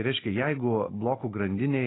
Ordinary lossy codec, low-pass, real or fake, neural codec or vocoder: AAC, 16 kbps; 7.2 kHz; real; none